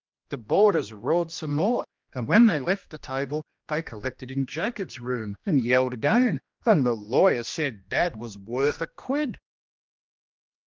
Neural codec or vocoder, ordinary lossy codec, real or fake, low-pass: codec, 16 kHz, 1 kbps, X-Codec, HuBERT features, trained on general audio; Opus, 32 kbps; fake; 7.2 kHz